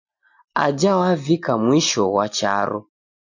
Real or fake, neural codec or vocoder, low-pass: real; none; 7.2 kHz